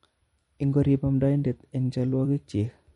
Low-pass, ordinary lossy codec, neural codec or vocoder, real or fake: 19.8 kHz; MP3, 48 kbps; vocoder, 44.1 kHz, 128 mel bands every 256 samples, BigVGAN v2; fake